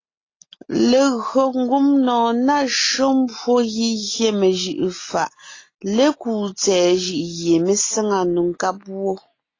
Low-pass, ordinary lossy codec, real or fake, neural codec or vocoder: 7.2 kHz; AAC, 32 kbps; real; none